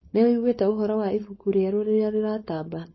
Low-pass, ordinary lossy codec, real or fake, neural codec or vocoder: 7.2 kHz; MP3, 24 kbps; fake; codec, 16 kHz, 4.8 kbps, FACodec